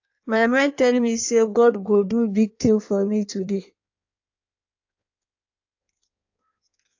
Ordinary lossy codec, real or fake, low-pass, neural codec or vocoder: AAC, 48 kbps; fake; 7.2 kHz; codec, 16 kHz in and 24 kHz out, 1.1 kbps, FireRedTTS-2 codec